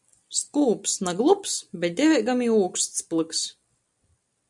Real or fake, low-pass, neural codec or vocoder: real; 10.8 kHz; none